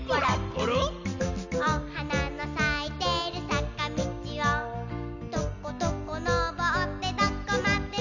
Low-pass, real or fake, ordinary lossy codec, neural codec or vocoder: 7.2 kHz; real; none; none